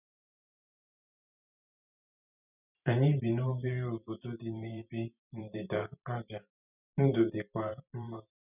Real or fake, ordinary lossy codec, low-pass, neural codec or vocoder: fake; none; 3.6 kHz; vocoder, 44.1 kHz, 128 mel bands every 512 samples, BigVGAN v2